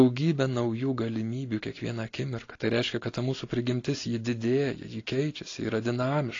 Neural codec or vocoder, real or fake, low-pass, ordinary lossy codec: none; real; 7.2 kHz; AAC, 32 kbps